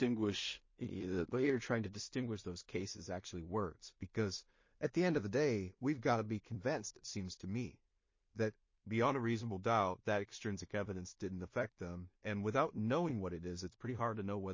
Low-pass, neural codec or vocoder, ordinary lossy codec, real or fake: 7.2 kHz; codec, 16 kHz in and 24 kHz out, 0.4 kbps, LongCat-Audio-Codec, two codebook decoder; MP3, 32 kbps; fake